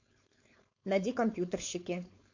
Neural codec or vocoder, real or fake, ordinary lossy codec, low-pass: codec, 16 kHz, 4.8 kbps, FACodec; fake; MP3, 48 kbps; 7.2 kHz